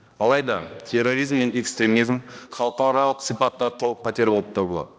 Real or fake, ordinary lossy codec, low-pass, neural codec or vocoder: fake; none; none; codec, 16 kHz, 1 kbps, X-Codec, HuBERT features, trained on balanced general audio